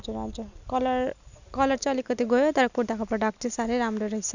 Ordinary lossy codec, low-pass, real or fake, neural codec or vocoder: none; 7.2 kHz; real; none